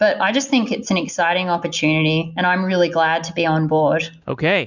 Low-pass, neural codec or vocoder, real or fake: 7.2 kHz; none; real